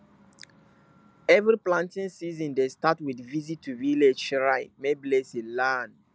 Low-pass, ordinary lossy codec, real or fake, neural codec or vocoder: none; none; real; none